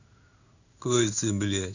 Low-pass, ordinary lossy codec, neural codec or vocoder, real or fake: 7.2 kHz; none; codec, 16 kHz in and 24 kHz out, 1 kbps, XY-Tokenizer; fake